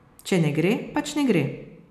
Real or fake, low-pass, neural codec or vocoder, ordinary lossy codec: real; 14.4 kHz; none; none